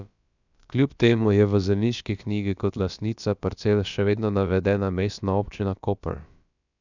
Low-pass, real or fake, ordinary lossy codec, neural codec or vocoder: 7.2 kHz; fake; none; codec, 16 kHz, about 1 kbps, DyCAST, with the encoder's durations